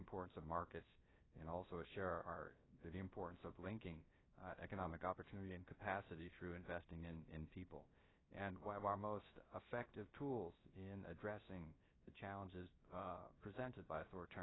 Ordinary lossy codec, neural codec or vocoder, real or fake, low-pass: AAC, 16 kbps; codec, 16 kHz, 0.3 kbps, FocalCodec; fake; 7.2 kHz